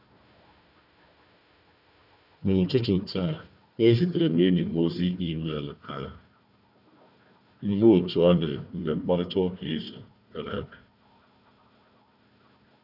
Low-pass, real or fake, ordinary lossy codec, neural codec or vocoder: 5.4 kHz; fake; none; codec, 16 kHz, 1 kbps, FunCodec, trained on Chinese and English, 50 frames a second